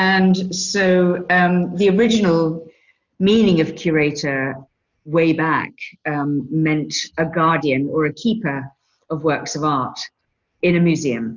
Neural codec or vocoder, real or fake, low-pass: none; real; 7.2 kHz